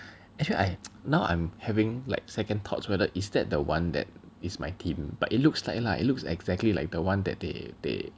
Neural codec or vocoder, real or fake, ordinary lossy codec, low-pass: none; real; none; none